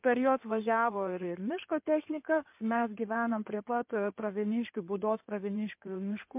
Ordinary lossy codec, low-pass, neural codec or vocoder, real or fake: MP3, 32 kbps; 3.6 kHz; codec, 16 kHz in and 24 kHz out, 2.2 kbps, FireRedTTS-2 codec; fake